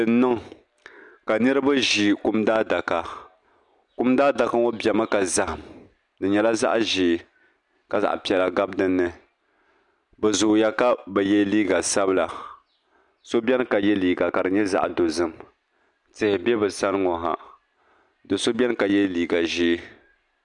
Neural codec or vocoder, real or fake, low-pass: none; real; 10.8 kHz